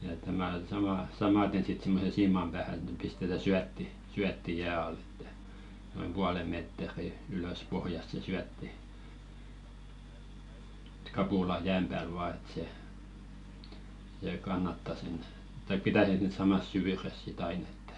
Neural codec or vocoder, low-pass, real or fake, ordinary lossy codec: none; 10.8 kHz; real; none